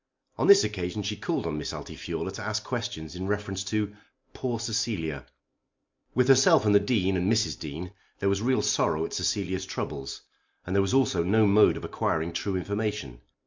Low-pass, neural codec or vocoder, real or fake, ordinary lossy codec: 7.2 kHz; none; real; MP3, 64 kbps